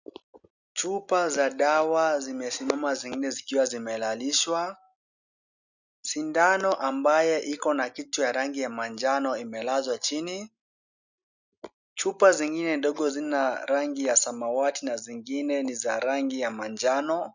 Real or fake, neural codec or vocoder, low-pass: real; none; 7.2 kHz